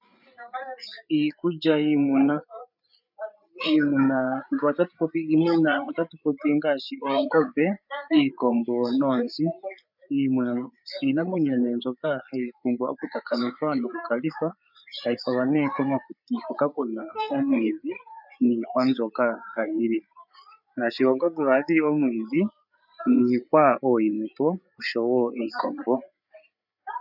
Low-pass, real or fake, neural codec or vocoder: 5.4 kHz; fake; codec, 16 kHz, 8 kbps, FreqCodec, larger model